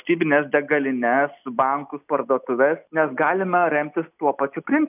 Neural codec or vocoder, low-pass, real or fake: none; 3.6 kHz; real